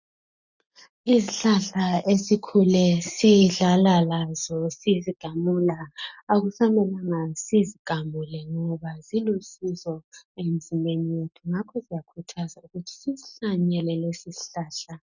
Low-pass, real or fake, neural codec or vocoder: 7.2 kHz; real; none